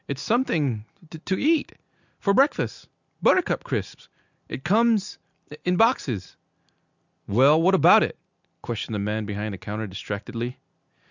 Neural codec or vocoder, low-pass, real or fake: none; 7.2 kHz; real